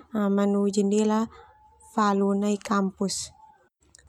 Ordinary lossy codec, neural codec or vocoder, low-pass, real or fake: none; none; 19.8 kHz; real